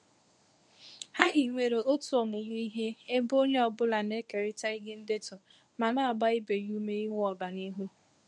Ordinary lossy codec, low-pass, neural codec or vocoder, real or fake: none; none; codec, 24 kHz, 0.9 kbps, WavTokenizer, medium speech release version 1; fake